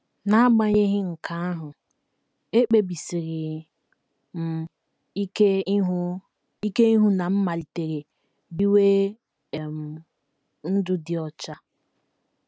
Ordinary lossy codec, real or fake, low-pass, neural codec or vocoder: none; real; none; none